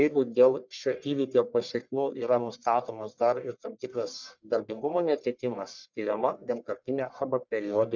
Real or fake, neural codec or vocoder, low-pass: fake; codec, 44.1 kHz, 1.7 kbps, Pupu-Codec; 7.2 kHz